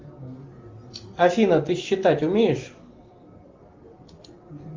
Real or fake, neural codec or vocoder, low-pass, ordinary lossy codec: real; none; 7.2 kHz; Opus, 32 kbps